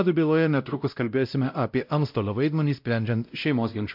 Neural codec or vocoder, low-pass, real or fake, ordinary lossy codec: codec, 16 kHz, 0.5 kbps, X-Codec, WavLM features, trained on Multilingual LibriSpeech; 5.4 kHz; fake; MP3, 48 kbps